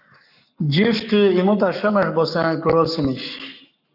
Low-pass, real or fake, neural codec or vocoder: 5.4 kHz; fake; codec, 44.1 kHz, 7.8 kbps, Pupu-Codec